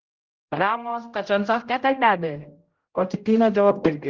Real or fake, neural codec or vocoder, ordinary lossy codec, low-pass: fake; codec, 16 kHz, 0.5 kbps, X-Codec, HuBERT features, trained on general audio; Opus, 16 kbps; 7.2 kHz